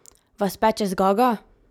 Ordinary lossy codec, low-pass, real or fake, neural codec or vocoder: none; 19.8 kHz; real; none